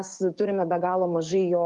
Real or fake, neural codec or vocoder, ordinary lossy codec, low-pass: fake; codec, 44.1 kHz, 7.8 kbps, DAC; Opus, 24 kbps; 10.8 kHz